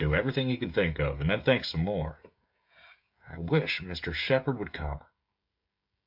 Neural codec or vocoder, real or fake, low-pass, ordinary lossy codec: codec, 16 kHz, 16 kbps, FreqCodec, smaller model; fake; 5.4 kHz; MP3, 48 kbps